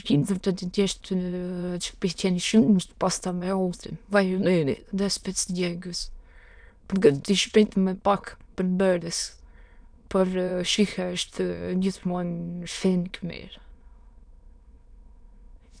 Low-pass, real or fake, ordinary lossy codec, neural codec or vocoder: 9.9 kHz; fake; none; autoencoder, 22.05 kHz, a latent of 192 numbers a frame, VITS, trained on many speakers